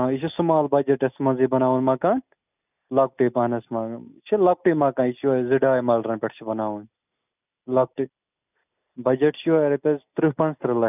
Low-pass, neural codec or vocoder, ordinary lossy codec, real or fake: 3.6 kHz; none; none; real